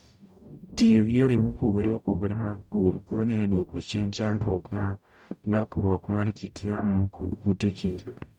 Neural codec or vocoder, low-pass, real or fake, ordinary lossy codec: codec, 44.1 kHz, 0.9 kbps, DAC; 19.8 kHz; fake; none